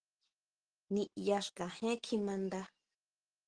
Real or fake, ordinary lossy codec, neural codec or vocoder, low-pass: real; Opus, 16 kbps; none; 9.9 kHz